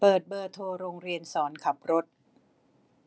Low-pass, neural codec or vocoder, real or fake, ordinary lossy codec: none; none; real; none